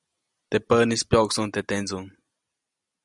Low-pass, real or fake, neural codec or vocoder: 10.8 kHz; real; none